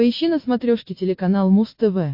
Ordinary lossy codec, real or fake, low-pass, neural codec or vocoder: MP3, 32 kbps; real; 5.4 kHz; none